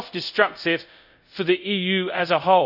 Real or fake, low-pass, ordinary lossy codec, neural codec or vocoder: fake; 5.4 kHz; none; codec, 24 kHz, 0.5 kbps, DualCodec